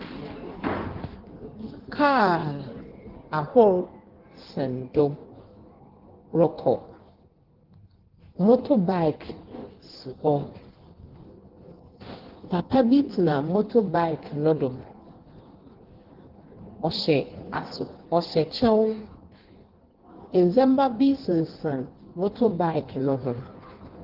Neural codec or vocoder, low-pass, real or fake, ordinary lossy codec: codec, 16 kHz in and 24 kHz out, 1.1 kbps, FireRedTTS-2 codec; 5.4 kHz; fake; Opus, 16 kbps